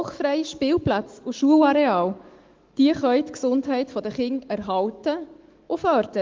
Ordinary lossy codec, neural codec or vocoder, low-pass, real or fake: Opus, 16 kbps; autoencoder, 48 kHz, 128 numbers a frame, DAC-VAE, trained on Japanese speech; 7.2 kHz; fake